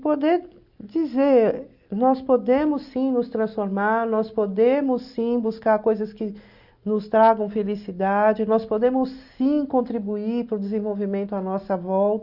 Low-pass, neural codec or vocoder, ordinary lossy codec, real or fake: 5.4 kHz; none; Opus, 64 kbps; real